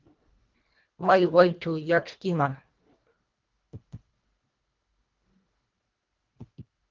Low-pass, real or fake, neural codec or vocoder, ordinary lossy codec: 7.2 kHz; fake; codec, 24 kHz, 1.5 kbps, HILCodec; Opus, 32 kbps